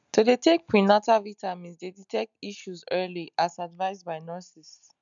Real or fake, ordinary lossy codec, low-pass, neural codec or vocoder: real; none; 7.2 kHz; none